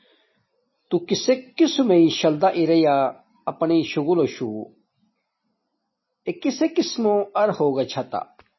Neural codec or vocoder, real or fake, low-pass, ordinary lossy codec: none; real; 7.2 kHz; MP3, 24 kbps